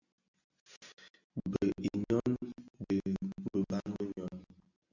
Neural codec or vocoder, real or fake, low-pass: none; real; 7.2 kHz